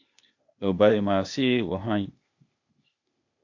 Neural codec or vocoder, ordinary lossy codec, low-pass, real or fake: codec, 16 kHz, 0.8 kbps, ZipCodec; MP3, 48 kbps; 7.2 kHz; fake